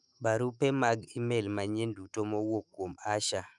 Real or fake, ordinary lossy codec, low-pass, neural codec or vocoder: fake; none; 10.8 kHz; autoencoder, 48 kHz, 128 numbers a frame, DAC-VAE, trained on Japanese speech